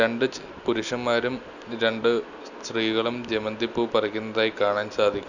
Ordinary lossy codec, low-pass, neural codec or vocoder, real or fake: none; 7.2 kHz; none; real